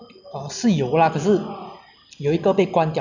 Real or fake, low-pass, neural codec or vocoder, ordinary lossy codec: real; 7.2 kHz; none; none